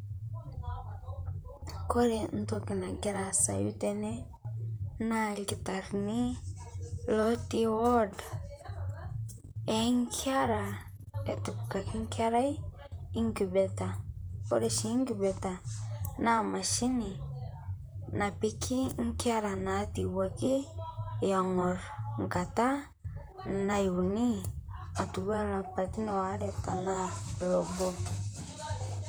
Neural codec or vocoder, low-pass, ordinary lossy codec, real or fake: vocoder, 44.1 kHz, 128 mel bands, Pupu-Vocoder; none; none; fake